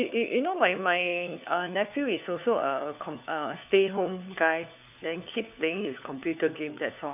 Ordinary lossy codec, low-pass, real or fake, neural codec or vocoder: none; 3.6 kHz; fake; codec, 16 kHz, 4 kbps, FunCodec, trained on LibriTTS, 50 frames a second